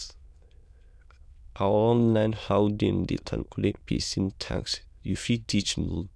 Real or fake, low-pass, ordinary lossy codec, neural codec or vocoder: fake; none; none; autoencoder, 22.05 kHz, a latent of 192 numbers a frame, VITS, trained on many speakers